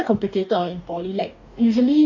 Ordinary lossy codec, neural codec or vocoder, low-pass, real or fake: AAC, 48 kbps; codec, 44.1 kHz, 2.6 kbps, DAC; 7.2 kHz; fake